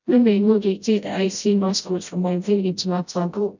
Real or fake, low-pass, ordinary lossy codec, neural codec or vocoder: fake; 7.2 kHz; none; codec, 16 kHz, 0.5 kbps, FreqCodec, smaller model